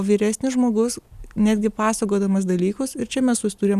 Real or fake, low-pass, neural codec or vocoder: real; 14.4 kHz; none